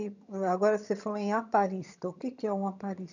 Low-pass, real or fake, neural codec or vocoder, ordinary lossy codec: 7.2 kHz; fake; vocoder, 22.05 kHz, 80 mel bands, HiFi-GAN; none